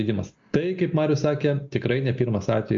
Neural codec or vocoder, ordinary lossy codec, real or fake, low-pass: none; MP3, 48 kbps; real; 7.2 kHz